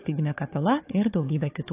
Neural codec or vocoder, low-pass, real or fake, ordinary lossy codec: codec, 16 kHz, 4 kbps, FunCodec, trained on Chinese and English, 50 frames a second; 3.6 kHz; fake; AAC, 24 kbps